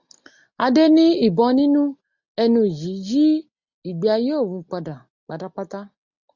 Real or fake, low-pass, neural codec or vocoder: real; 7.2 kHz; none